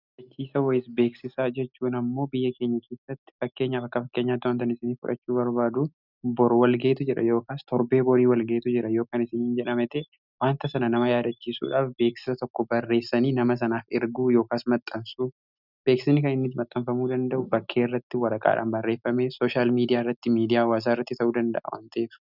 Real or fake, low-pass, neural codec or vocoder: real; 5.4 kHz; none